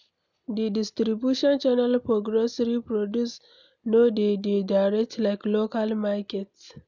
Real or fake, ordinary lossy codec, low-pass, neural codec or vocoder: real; none; 7.2 kHz; none